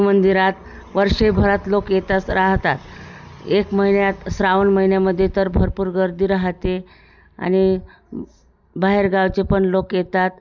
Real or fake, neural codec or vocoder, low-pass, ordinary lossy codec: real; none; 7.2 kHz; none